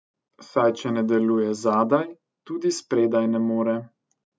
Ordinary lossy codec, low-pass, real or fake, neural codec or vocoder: none; none; real; none